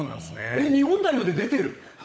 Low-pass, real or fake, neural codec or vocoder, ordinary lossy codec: none; fake; codec, 16 kHz, 16 kbps, FunCodec, trained on LibriTTS, 50 frames a second; none